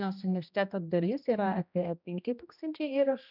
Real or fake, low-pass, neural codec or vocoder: fake; 5.4 kHz; codec, 16 kHz, 1 kbps, X-Codec, HuBERT features, trained on general audio